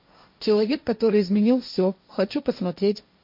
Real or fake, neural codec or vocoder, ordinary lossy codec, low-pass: fake; codec, 16 kHz, 1.1 kbps, Voila-Tokenizer; MP3, 32 kbps; 5.4 kHz